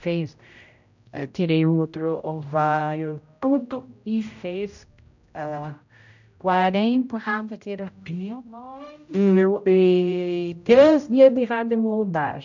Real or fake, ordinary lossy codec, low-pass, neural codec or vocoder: fake; none; 7.2 kHz; codec, 16 kHz, 0.5 kbps, X-Codec, HuBERT features, trained on general audio